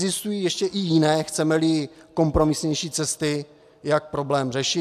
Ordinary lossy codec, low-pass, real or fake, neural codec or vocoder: AAC, 96 kbps; 14.4 kHz; real; none